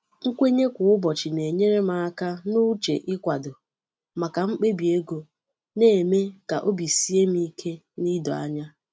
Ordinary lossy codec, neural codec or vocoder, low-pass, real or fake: none; none; none; real